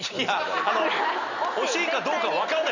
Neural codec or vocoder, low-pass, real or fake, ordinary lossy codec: none; 7.2 kHz; real; none